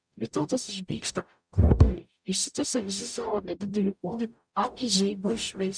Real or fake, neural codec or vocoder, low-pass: fake; codec, 44.1 kHz, 0.9 kbps, DAC; 9.9 kHz